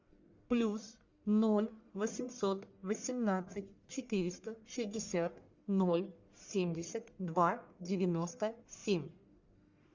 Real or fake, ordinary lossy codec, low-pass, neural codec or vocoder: fake; Opus, 64 kbps; 7.2 kHz; codec, 44.1 kHz, 1.7 kbps, Pupu-Codec